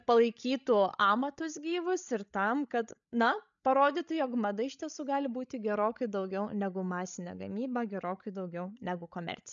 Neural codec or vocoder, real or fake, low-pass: codec, 16 kHz, 16 kbps, FreqCodec, larger model; fake; 7.2 kHz